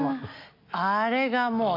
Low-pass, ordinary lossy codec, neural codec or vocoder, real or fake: 5.4 kHz; MP3, 48 kbps; none; real